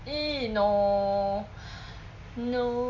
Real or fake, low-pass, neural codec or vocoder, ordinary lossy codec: real; 7.2 kHz; none; MP3, 48 kbps